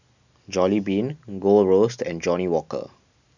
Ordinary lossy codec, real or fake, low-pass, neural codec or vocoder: none; real; 7.2 kHz; none